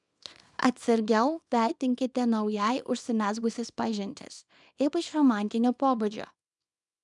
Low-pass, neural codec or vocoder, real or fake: 10.8 kHz; codec, 24 kHz, 0.9 kbps, WavTokenizer, small release; fake